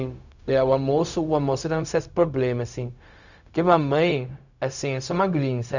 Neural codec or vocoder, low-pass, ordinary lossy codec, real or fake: codec, 16 kHz, 0.4 kbps, LongCat-Audio-Codec; 7.2 kHz; none; fake